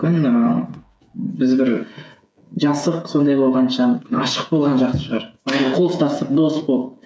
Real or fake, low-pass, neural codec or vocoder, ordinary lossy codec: fake; none; codec, 16 kHz, 8 kbps, FreqCodec, smaller model; none